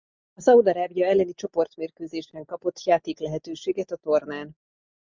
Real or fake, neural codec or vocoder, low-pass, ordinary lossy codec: real; none; 7.2 kHz; MP3, 64 kbps